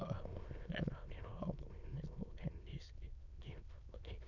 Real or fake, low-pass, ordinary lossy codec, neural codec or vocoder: fake; 7.2 kHz; none; autoencoder, 22.05 kHz, a latent of 192 numbers a frame, VITS, trained on many speakers